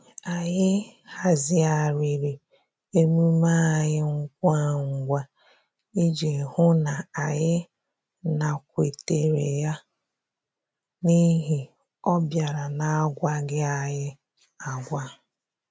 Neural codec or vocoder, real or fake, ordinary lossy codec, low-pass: none; real; none; none